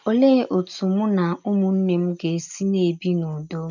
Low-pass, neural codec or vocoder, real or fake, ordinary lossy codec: 7.2 kHz; none; real; none